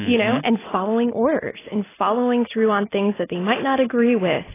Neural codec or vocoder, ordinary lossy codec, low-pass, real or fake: none; AAC, 16 kbps; 3.6 kHz; real